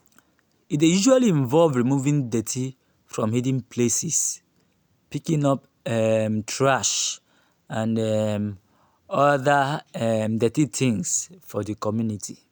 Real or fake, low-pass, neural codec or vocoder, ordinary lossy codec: real; none; none; none